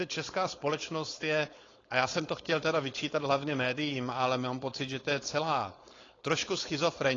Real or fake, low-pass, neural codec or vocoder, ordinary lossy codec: fake; 7.2 kHz; codec, 16 kHz, 4.8 kbps, FACodec; AAC, 32 kbps